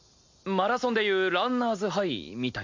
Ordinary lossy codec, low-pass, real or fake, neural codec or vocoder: MP3, 64 kbps; 7.2 kHz; real; none